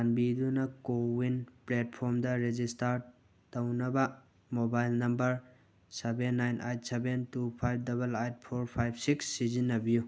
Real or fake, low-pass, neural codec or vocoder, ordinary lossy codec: real; none; none; none